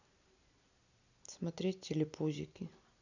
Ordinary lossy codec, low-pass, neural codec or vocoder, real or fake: none; 7.2 kHz; none; real